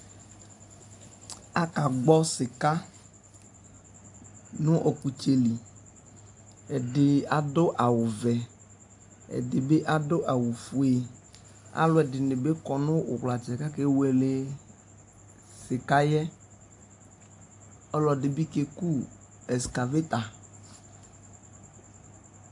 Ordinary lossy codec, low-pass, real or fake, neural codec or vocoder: AAC, 64 kbps; 10.8 kHz; real; none